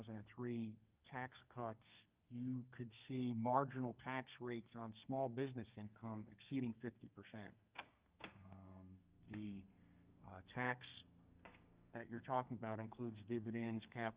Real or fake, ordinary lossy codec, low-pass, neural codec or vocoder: fake; Opus, 32 kbps; 3.6 kHz; codec, 32 kHz, 1.9 kbps, SNAC